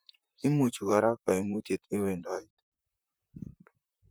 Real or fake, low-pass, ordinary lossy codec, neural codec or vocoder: fake; none; none; vocoder, 44.1 kHz, 128 mel bands, Pupu-Vocoder